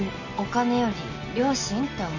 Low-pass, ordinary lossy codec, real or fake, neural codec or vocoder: 7.2 kHz; none; real; none